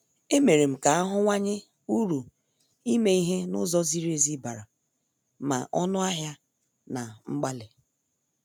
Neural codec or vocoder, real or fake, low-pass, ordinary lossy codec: none; real; none; none